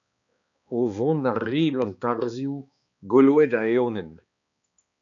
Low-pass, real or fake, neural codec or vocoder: 7.2 kHz; fake; codec, 16 kHz, 2 kbps, X-Codec, HuBERT features, trained on balanced general audio